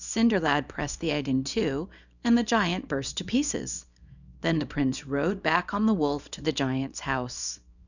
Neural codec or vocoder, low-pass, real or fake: codec, 24 kHz, 0.9 kbps, WavTokenizer, small release; 7.2 kHz; fake